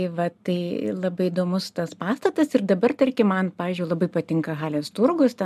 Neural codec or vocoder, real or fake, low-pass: none; real; 14.4 kHz